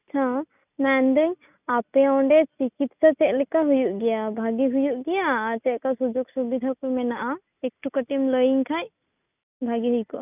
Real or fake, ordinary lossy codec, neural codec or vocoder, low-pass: real; none; none; 3.6 kHz